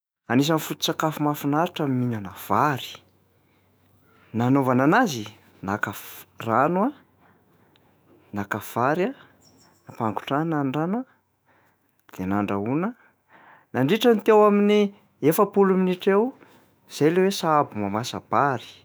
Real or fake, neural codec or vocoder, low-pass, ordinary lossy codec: fake; autoencoder, 48 kHz, 128 numbers a frame, DAC-VAE, trained on Japanese speech; none; none